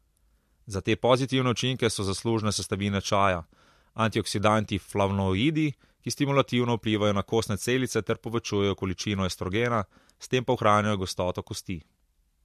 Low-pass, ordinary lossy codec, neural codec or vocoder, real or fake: 14.4 kHz; MP3, 64 kbps; none; real